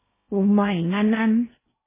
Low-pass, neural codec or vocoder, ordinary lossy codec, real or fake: 3.6 kHz; codec, 16 kHz in and 24 kHz out, 0.6 kbps, FocalCodec, streaming, 2048 codes; MP3, 16 kbps; fake